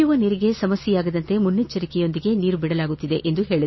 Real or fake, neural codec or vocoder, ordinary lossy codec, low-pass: real; none; MP3, 24 kbps; 7.2 kHz